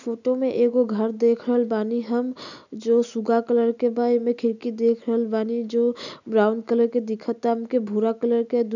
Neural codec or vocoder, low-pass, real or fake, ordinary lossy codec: none; 7.2 kHz; real; none